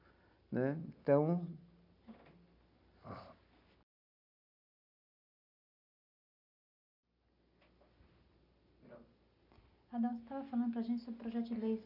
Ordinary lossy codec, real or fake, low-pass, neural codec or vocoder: none; real; 5.4 kHz; none